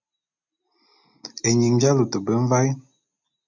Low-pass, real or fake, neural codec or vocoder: 7.2 kHz; real; none